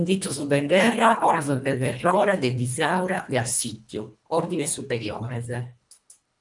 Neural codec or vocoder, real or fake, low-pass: codec, 24 kHz, 1.5 kbps, HILCodec; fake; 10.8 kHz